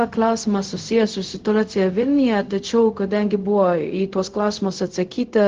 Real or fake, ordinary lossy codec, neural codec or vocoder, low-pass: fake; Opus, 16 kbps; codec, 16 kHz, 0.4 kbps, LongCat-Audio-Codec; 7.2 kHz